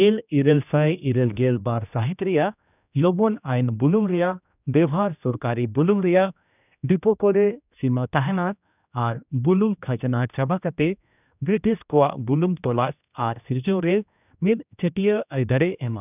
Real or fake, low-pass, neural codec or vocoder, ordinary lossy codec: fake; 3.6 kHz; codec, 16 kHz, 1 kbps, X-Codec, HuBERT features, trained on general audio; none